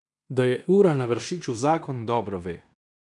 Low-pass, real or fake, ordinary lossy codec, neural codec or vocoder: 10.8 kHz; fake; none; codec, 16 kHz in and 24 kHz out, 0.9 kbps, LongCat-Audio-Codec, fine tuned four codebook decoder